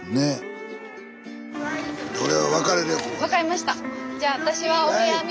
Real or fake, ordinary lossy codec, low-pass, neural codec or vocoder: real; none; none; none